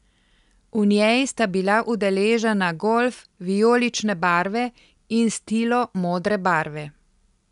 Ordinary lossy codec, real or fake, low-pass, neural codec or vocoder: none; real; 10.8 kHz; none